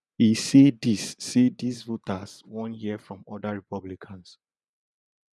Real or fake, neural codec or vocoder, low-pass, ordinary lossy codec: fake; vocoder, 24 kHz, 100 mel bands, Vocos; none; none